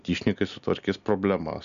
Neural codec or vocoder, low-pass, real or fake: none; 7.2 kHz; real